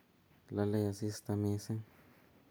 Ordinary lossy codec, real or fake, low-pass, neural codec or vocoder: none; real; none; none